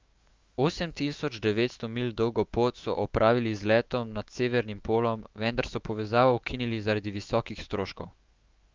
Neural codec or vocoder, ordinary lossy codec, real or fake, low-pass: autoencoder, 48 kHz, 128 numbers a frame, DAC-VAE, trained on Japanese speech; Opus, 32 kbps; fake; 7.2 kHz